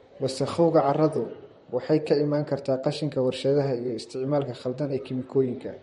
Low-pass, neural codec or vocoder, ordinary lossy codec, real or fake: 19.8 kHz; vocoder, 44.1 kHz, 128 mel bands, Pupu-Vocoder; MP3, 48 kbps; fake